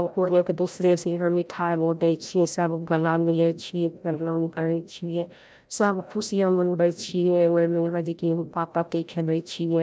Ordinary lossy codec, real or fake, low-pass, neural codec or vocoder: none; fake; none; codec, 16 kHz, 0.5 kbps, FreqCodec, larger model